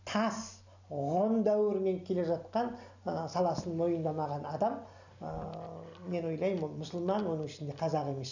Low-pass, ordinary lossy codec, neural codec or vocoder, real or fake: 7.2 kHz; none; none; real